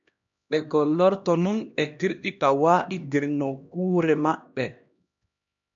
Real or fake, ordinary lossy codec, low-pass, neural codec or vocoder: fake; MP3, 64 kbps; 7.2 kHz; codec, 16 kHz, 1 kbps, X-Codec, HuBERT features, trained on LibriSpeech